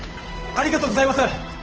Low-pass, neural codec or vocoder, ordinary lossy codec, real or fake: 7.2 kHz; codec, 16 kHz, 8 kbps, FunCodec, trained on Chinese and English, 25 frames a second; Opus, 16 kbps; fake